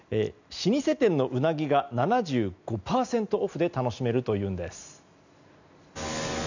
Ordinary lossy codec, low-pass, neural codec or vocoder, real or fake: none; 7.2 kHz; none; real